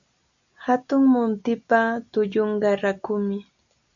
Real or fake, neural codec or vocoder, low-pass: real; none; 7.2 kHz